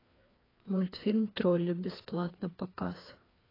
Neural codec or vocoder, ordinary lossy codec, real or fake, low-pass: codec, 16 kHz, 2 kbps, FreqCodec, larger model; AAC, 24 kbps; fake; 5.4 kHz